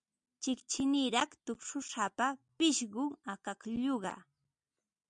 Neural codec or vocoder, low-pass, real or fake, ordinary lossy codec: none; 9.9 kHz; real; MP3, 96 kbps